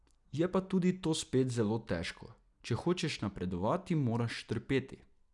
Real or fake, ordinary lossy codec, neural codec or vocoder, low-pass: real; none; none; 10.8 kHz